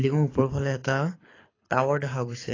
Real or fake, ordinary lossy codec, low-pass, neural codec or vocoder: fake; AAC, 32 kbps; 7.2 kHz; codec, 24 kHz, 6 kbps, HILCodec